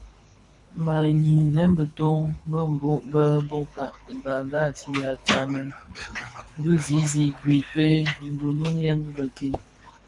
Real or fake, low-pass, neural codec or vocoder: fake; 10.8 kHz; codec, 24 kHz, 3 kbps, HILCodec